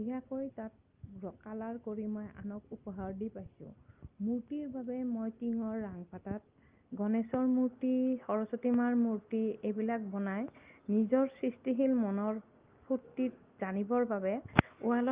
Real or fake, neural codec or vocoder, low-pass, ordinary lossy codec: real; none; 3.6 kHz; Opus, 24 kbps